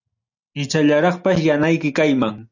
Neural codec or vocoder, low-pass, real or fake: none; 7.2 kHz; real